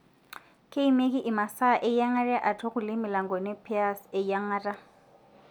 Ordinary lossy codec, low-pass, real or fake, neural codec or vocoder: none; 19.8 kHz; real; none